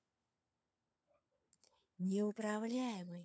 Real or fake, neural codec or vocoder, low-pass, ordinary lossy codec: fake; codec, 16 kHz, 4 kbps, FunCodec, trained on LibriTTS, 50 frames a second; none; none